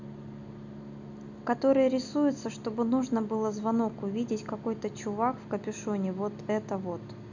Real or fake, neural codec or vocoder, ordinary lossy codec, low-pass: real; none; none; 7.2 kHz